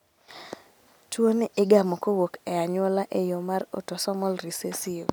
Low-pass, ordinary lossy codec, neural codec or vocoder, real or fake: none; none; none; real